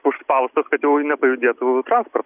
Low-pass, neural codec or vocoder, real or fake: 3.6 kHz; none; real